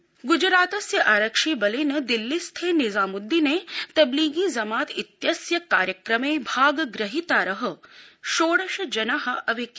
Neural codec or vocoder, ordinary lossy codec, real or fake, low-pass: none; none; real; none